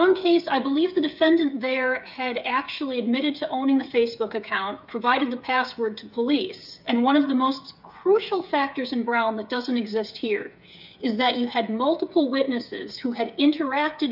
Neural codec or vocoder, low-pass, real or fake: codec, 16 kHz, 8 kbps, FreqCodec, smaller model; 5.4 kHz; fake